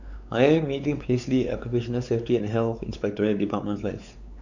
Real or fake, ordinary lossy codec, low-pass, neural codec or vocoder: fake; none; 7.2 kHz; codec, 16 kHz, 4 kbps, X-Codec, WavLM features, trained on Multilingual LibriSpeech